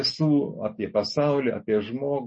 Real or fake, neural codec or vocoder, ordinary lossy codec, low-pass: real; none; MP3, 32 kbps; 9.9 kHz